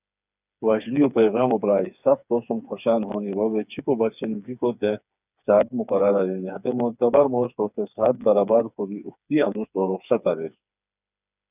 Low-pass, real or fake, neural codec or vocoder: 3.6 kHz; fake; codec, 16 kHz, 4 kbps, FreqCodec, smaller model